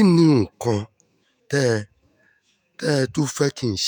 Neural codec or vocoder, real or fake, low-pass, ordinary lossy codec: autoencoder, 48 kHz, 128 numbers a frame, DAC-VAE, trained on Japanese speech; fake; none; none